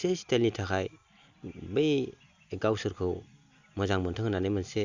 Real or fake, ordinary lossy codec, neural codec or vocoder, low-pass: real; Opus, 64 kbps; none; 7.2 kHz